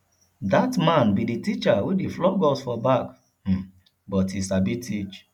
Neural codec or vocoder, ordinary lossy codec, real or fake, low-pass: none; none; real; 19.8 kHz